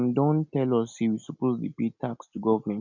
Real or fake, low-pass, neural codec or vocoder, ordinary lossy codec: real; 7.2 kHz; none; none